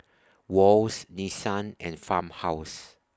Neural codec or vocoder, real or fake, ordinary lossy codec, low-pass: none; real; none; none